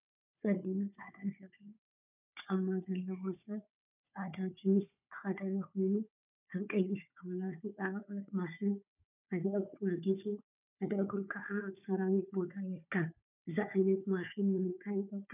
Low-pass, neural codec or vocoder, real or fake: 3.6 kHz; codec, 16 kHz, 4 kbps, FunCodec, trained on Chinese and English, 50 frames a second; fake